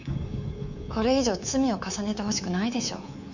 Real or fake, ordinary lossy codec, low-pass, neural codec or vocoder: fake; none; 7.2 kHz; codec, 24 kHz, 3.1 kbps, DualCodec